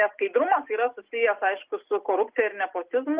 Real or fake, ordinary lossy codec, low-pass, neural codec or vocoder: real; Opus, 32 kbps; 3.6 kHz; none